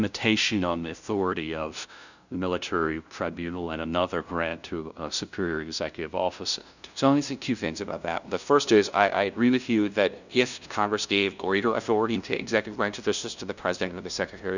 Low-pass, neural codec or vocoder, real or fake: 7.2 kHz; codec, 16 kHz, 0.5 kbps, FunCodec, trained on LibriTTS, 25 frames a second; fake